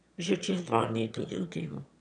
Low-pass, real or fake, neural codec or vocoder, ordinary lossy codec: 9.9 kHz; fake; autoencoder, 22.05 kHz, a latent of 192 numbers a frame, VITS, trained on one speaker; none